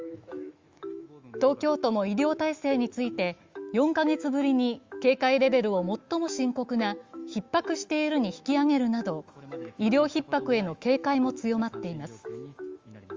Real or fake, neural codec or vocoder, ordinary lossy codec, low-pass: fake; autoencoder, 48 kHz, 128 numbers a frame, DAC-VAE, trained on Japanese speech; Opus, 32 kbps; 7.2 kHz